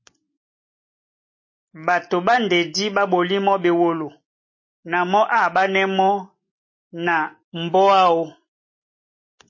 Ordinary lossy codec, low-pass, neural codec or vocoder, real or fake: MP3, 32 kbps; 7.2 kHz; none; real